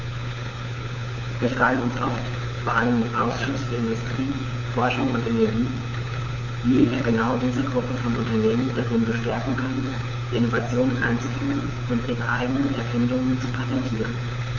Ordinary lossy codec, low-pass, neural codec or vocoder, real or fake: none; 7.2 kHz; codec, 16 kHz, 4 kbps, FunCodec, trained on LibriTTS, 50 frames a second; fake